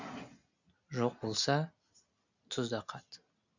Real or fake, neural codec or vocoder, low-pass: real; none; 7.2 kHz